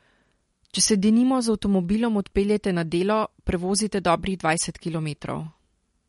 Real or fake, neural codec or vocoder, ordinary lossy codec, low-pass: real; none; MP3, 48 kbps; 19.8 kHz